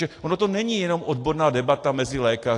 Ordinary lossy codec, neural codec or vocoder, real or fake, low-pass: AAC, 48 kbps; none; real; 10.8 kHz